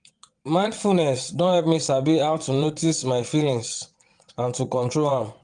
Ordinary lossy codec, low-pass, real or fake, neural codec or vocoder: Opus, 32 kbps; 9.9 kHz; fake; vocoder, 22.05 kHz, 80 mel bands, WaveNeXt